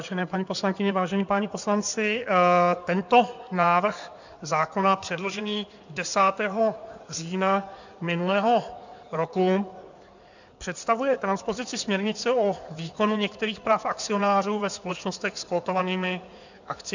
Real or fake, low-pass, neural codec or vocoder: fake; 7.2 kHz; codec, 16 kHz in and 24 kHz out, 2.2 kbps, FireRedTTS-2 codec